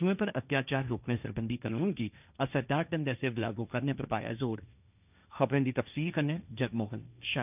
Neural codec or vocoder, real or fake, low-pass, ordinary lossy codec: codec, 16 kHz, 1.1 kbps, Voila-Tokenizer; fake; 3.6 kHz; none